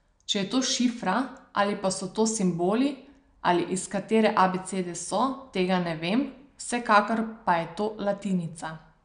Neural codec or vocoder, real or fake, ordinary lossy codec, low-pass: none; real; none; 9.9 kHz